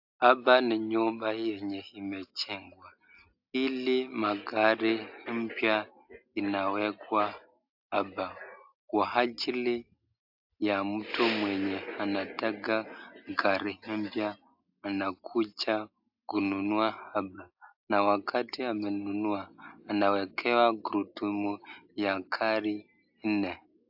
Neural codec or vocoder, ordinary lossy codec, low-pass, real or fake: none; AAC, 48 kbps; 5.4 kHz; real